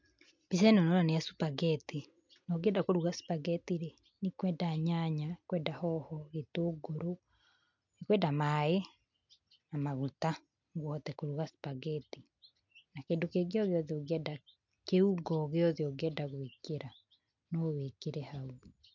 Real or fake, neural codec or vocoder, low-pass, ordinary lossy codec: real; none; 7.2 kHz; MP3, 64 kbps